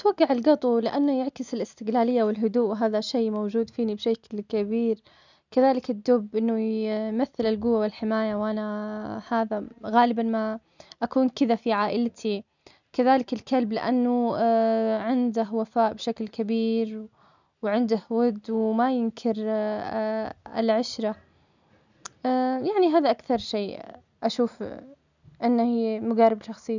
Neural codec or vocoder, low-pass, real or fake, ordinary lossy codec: none; 7.2 kHz; real; none